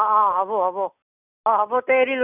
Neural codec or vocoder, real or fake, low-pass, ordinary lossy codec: none; real; 3.6 kHz; none